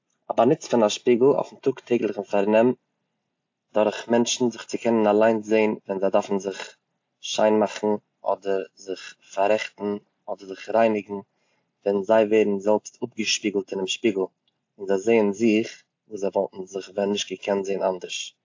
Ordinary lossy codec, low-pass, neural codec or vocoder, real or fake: AAC, 48 kbps; 7.2 kHz; none; real